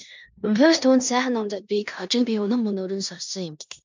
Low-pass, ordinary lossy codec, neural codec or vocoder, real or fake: 7.2 kHz; MP3, 64 kbps; codec, 16 kHz in and 24 kHz out, 0.9 kbps, LongCat-Audio-Codec, four codebook decoder; fake